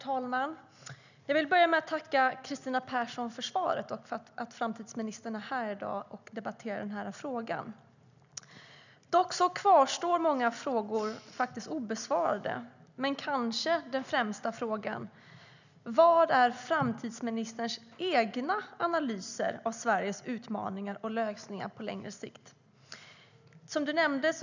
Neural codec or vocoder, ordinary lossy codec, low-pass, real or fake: none; none; 7.2 kHz; real